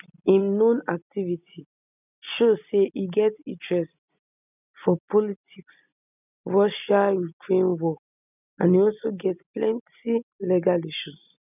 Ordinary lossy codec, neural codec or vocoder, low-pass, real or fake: none; none; 3.6 kHz; real